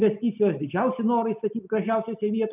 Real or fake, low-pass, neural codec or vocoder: real; 3.6 kHz; none